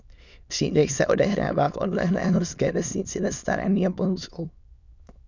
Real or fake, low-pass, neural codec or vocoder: fake; 7.2 kHz; autoencoder, 22.05 kHz, a latent of 192 numbers a frame, VITS, trained on many speakers